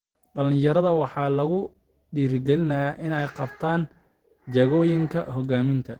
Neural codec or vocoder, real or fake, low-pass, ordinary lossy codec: vocoder, 48 kHz, 128 mel bands, Vocos; fake; 19.8 kHz; Opus, 16 kbps